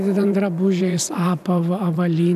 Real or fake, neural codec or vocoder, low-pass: fake; vocoder, 44.1 kHz, 128 mel bands every 512 samples, BigVGAN v2; 14.4 kHz